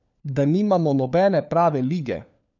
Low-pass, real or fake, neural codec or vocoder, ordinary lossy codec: 7.2 kHz; fake; codec, 16 kHz, 4 kbps, FunCodec, trained on LibriTTS, 50 frames a second; none